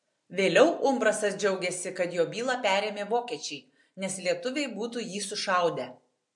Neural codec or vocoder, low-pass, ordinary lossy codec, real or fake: none; 10.8 kHz; MP3, 64 kbps; real